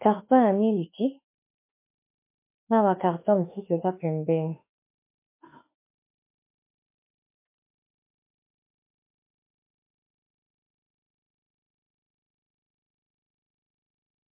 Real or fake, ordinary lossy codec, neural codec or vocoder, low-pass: fake; MP3, 24 kbps; autoencoder, 48 kHz, 32 numbers a frame, DAC-VAE, trained on Japanese speech; 3.6 kHz